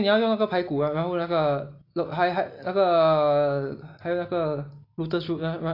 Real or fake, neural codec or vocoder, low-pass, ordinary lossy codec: real; none; 5.4 kHz; AAC, 32 kbps